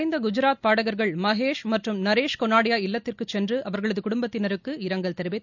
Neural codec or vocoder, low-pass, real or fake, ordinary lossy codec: none; 7.2 kHz; real; none